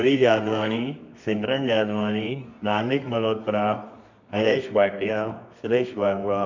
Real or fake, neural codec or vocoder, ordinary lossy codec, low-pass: fake; codec, 24 kHz, 0.9 kbps, WavTokenizer, medium music audio release; MP3, 64 kbps; 7.2 kHz